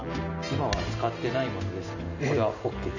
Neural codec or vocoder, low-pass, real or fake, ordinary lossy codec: none; 7.2 kHz; real; none